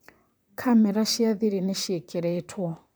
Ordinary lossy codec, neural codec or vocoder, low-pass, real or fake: none; vocoder, 44.1 kHz, 128 mel bands, Pupu-Vocoder; none; fake